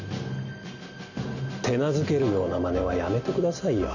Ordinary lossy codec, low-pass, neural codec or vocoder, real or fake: none; 7.2 kHz; none; real